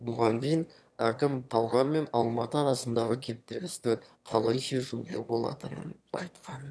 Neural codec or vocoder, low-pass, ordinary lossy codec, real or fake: autoencoder, 22.05 kHz, a latent of 192 numbers a frame, VITS, trained on one speaker; none; none; fake